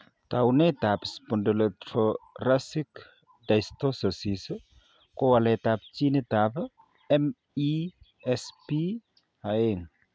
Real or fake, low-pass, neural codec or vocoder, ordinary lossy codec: real; none; none; none